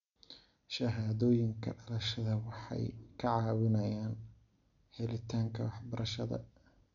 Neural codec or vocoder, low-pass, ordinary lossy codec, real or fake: none; 7.2 kHz; none; real